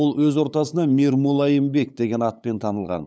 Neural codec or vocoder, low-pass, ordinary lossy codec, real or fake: codec, 16 kHz, 8 kbps, FunCodec, trained on LibriTTS, 25 frames a second; none; none; fake